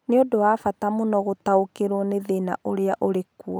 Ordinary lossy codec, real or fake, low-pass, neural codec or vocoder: none; real; none; none